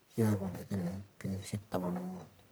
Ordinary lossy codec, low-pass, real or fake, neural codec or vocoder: none; none; fake; codec, 44.1 kHz, 1.7 kbps, Pupu-Codec